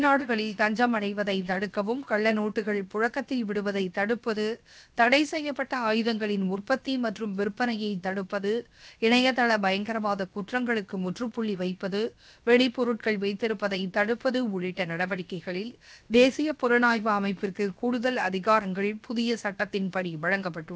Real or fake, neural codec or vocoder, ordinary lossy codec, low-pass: fake; codec, 16 kHz, 0.7 kbps, FocalCodec; none; none